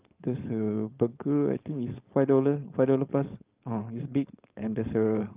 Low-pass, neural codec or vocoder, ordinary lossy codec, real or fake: 3.6 kHz; codec, 16 kHz, 4.8 kbps, FACodec; Opus, 32 kbps; fake